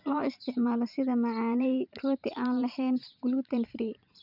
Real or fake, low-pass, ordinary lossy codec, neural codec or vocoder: fake; 5.4 kHz; none; vocoder, 44.1 kHz, 128 mel bands every 512 samples, BigVGAN v2